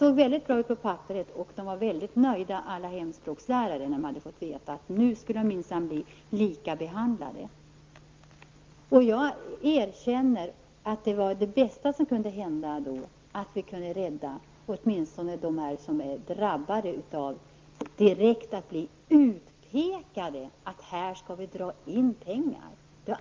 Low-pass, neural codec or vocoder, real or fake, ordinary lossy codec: 7.2 kHz; none; real; Opus, 32 kbps